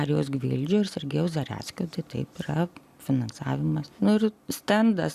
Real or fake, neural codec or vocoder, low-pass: real; none; 14.4 kHz